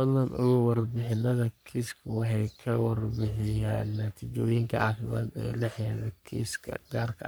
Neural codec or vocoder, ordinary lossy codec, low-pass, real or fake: codec, 44.1 kHz, 3.4 kbps, Pupu-Codec; none; none; fake